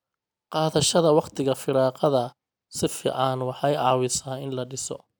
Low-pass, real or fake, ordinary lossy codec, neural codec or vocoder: none; real; none; none